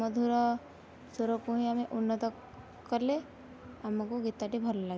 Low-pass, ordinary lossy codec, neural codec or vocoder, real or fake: none; none; none; real